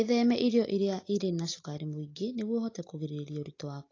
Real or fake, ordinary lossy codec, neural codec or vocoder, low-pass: real; AAC, 32 kbps; none; 7.2 kHz